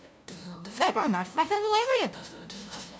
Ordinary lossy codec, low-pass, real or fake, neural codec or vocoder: none; none; fake; codec, 16 kHz, 0.5 kbps, FunCodec, trained on LibriTTS, 25 frames a second